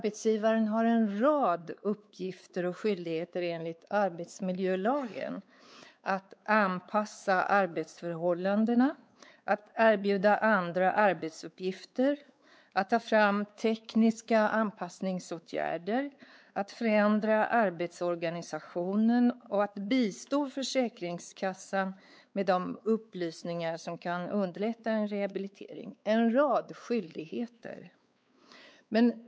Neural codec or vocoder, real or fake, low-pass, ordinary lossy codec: codec, 16 kHz, 4 kbps, X-Codec, WavLM features, trained on Multilingual LibriSpeech; fake; none; none